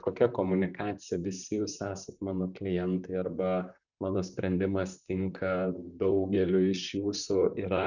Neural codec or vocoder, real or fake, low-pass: vocoder, 44.1 kHz, 128 mel bands, Pupu-Vocoder; fake; 7.2 kHz